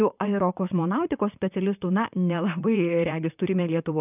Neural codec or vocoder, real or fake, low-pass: vocoder, 44.1 kHz, 128 mel bands, Pupu-Vocoder; fake; 3.6 kHz